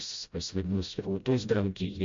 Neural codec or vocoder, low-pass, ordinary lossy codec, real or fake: codec, 16 kHz, 0.5 kbps, FreqCodec, smaller model; 7.2 kHz; MP3, 48 kbps; fake